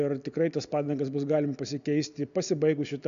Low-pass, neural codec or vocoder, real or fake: 7.2 kHz; none; real